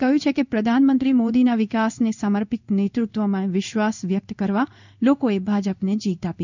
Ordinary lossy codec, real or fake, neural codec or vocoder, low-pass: none; fake; codec, 16 kHz in and 24 kHz out, 1 kbps, XY-Tokenizer; 7.2 kHz